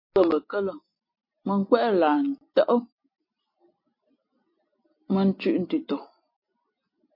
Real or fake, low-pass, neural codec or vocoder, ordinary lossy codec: real; 5.4 kHz; none; MP3, 32 kbps